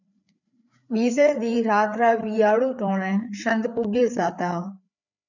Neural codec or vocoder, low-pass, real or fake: codec, 16 kHz, 4 kbps, FreqCodec, larger model; 7.2 kHz; fake